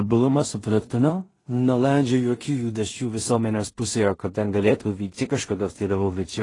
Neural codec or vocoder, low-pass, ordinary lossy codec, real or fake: codec, 16 kHz in and 24 kHz out, 0.4 kbps, LongCat-Audio-Codec, two codebook decoder; 10.8 kHz; AAC, 32 kbps; fake